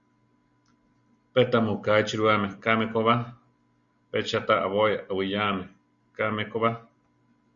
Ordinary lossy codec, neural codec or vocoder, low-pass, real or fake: Opus, 64 kbps; none; 7.2 kHz; real